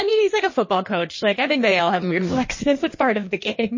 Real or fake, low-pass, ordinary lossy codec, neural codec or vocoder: fake; 7.2 kHz; MP3, 32 kbps; codec, 16 kHz in and 24 kHz out, 1.1 kbps, FireRedTTS-2 codec